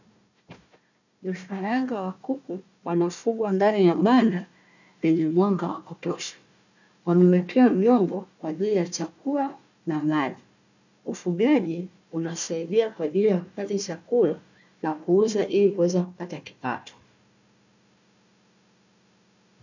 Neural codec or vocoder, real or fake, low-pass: codec, 16 kHz, 1 kbps, FunCodec, trained on Chinese and English, 50 frames a second; fake; 7.2 kHz